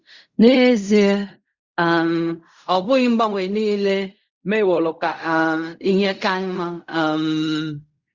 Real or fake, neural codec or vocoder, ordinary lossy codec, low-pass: fake; codec, 16 kHz in and 24 kHz out, 0.4 kbps, LongCat-Audio-Codec, fine tuned four codebook decoder; Opus, 64 kbps; 7.2 kHz